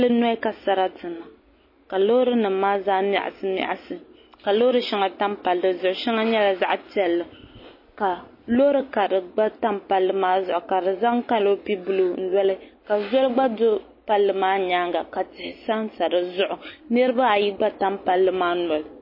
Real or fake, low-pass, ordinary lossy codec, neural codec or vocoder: real; 5.4 kHz; MP3, 24 kbps; none